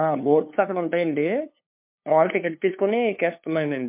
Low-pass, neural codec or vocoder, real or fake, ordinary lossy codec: 3.6 kHz; codec, 16 kHz, 2 kbps, FunCodec, trained on LibriTTS, 25 frames a second; fake; MP3, 32 kbps